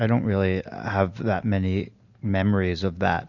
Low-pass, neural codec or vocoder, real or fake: 7.2 kHz; none; real